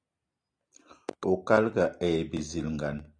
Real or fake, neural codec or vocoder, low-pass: real; none; 9.9 kHz